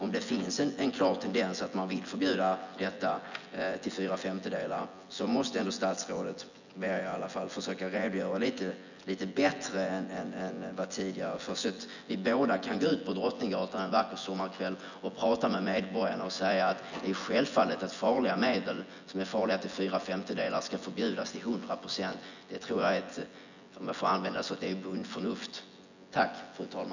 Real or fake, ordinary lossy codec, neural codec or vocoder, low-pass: fake; none; vocoder, 24 kHz, 100 mel bands, Vocos; 7.2 kHz